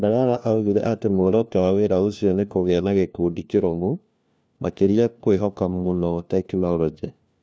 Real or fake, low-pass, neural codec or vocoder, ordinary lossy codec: fake; none; codec, 16 kHz, 1 kbps, FunCodec, trained on LibriTTS, 50 frames a second; none